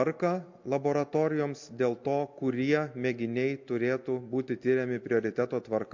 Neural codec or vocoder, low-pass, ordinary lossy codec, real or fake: none; 7.2 kHz; MP3, 48 kbps; real